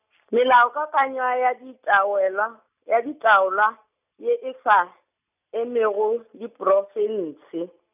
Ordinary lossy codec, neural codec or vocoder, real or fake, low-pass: none; none; real; 3.6 kHz